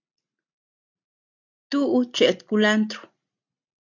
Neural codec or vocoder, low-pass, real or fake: none; 7.2 kHz; real